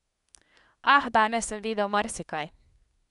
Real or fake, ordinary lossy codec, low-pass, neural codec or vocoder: fake; none; 10.8 kHz; codec, 24 kHz, 1 kbps, SNAC